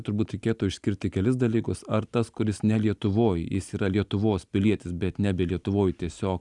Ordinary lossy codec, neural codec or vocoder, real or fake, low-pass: Opus, 64 kbps; none; real; 10.8 kHz